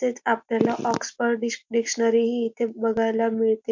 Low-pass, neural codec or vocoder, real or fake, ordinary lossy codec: 7.2 kHz; none; real; MP3, 48 kbps